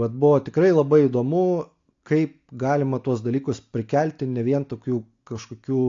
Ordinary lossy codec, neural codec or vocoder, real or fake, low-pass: AAC, 48 kbps; none; real; 7.2 kHz